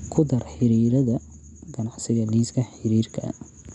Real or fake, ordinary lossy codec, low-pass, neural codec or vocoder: real; none; 10.8 kHz; none